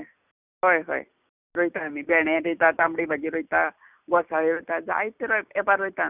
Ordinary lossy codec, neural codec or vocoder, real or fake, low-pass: none; none; real; 3.6 kHz